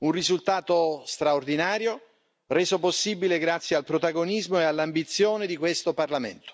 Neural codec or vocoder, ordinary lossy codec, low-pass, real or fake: none; none; none; real